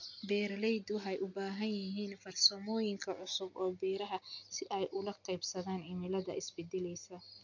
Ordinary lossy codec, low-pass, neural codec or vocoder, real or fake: none; 7.2 kHz; none; real